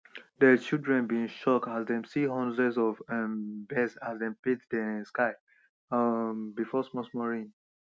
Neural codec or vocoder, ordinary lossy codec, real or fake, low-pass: none; none; real; none